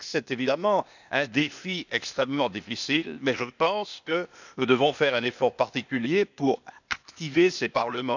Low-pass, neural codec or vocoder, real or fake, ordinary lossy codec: 7.2 kHz; codec, 16 kHz, 0.8 kbps, ZipCodec; fake; none